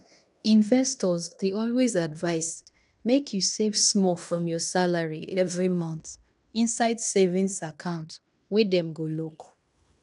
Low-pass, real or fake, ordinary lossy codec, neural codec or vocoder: 10.8 kHz; fake; none; codec, 16 kHz in and 24 kHz out, 0.9 kbps, LongCat-Audio-Codec, fine tuned four codebook decoder